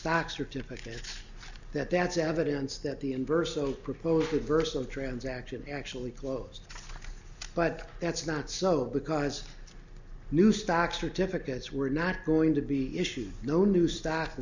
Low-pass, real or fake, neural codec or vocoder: 7.2 kHz; real; none